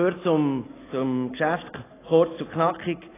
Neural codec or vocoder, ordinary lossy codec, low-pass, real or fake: none; AAC, 16 kbps; 3.6 kHz; real